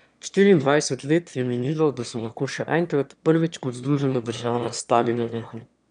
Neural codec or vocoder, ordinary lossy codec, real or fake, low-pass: autoencoder, 22.05 kHz, a latent of 192 numbers a frame, VITS, trained on one speaker; none; fake; 9.9 kHz